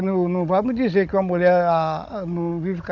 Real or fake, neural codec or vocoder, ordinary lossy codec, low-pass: fake; codec, 16 kHz, 16 kbps, FunCodec, trained on Chinese and English, 50 frames a second; none; 7.2 kHz